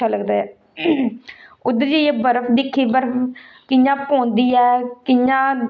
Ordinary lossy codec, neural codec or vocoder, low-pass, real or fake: none; none; none; real